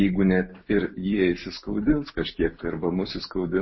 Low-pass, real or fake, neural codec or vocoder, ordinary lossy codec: 7.2 kHz; real; none; MP3, 24 kbps